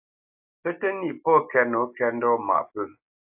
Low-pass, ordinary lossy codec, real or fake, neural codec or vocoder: 3.6 kHz; AAC, 32 kbps; real; none